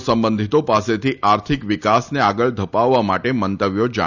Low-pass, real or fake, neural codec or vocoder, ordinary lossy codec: 7.2 kHz; real; none; none